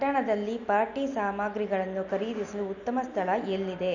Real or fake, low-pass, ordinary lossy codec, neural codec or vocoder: real; 7.2 kHz; none; none